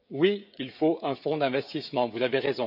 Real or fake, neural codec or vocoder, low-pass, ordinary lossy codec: fake; codec, 16 kHz, 16 kbps, FreqCodec, smaller model; 5.4 kHz; AAC, 48 kbps